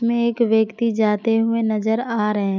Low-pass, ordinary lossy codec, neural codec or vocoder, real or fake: 7.2 kHz; none; none; real